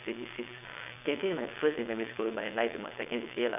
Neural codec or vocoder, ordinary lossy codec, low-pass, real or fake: vocoder, 22.05 kHz, 80 mel bands, WaveNeXt; none; 3.6 kHz; fake